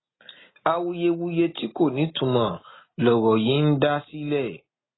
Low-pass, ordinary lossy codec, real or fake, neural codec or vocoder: 7.2 kHz; AAC, 16 kbps; real; none